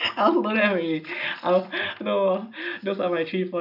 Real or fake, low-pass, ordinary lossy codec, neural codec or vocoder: real; 5.4 kHz; none; none